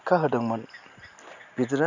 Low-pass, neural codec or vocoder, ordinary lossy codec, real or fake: 7.2 kHz; none; none; real